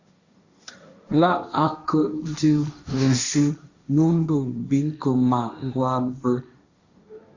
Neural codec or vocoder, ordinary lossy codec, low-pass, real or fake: codec, 16 kHz, 1.1 kbps, Voila-Tokenizer; Opus, 64 kbps; 7.2 kHz; fake